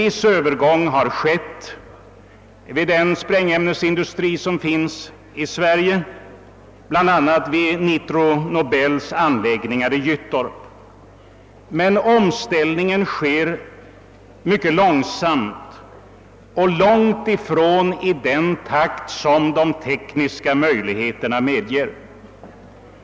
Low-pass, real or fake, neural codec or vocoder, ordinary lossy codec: none; real; none; none